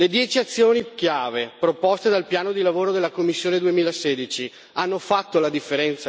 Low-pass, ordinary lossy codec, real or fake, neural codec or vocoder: none; none; real; none